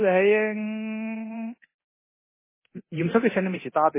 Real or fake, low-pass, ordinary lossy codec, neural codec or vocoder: fake; 3.6 kHz; MP3, 16 kbps; codec, 24 kHz, 0.9 kbps, DualCodec